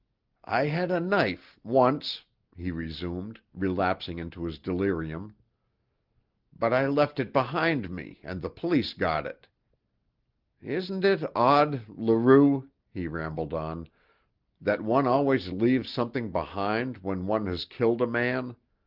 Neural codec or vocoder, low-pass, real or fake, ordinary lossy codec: none; 5.4 kHz; real; Opus, 24 kbps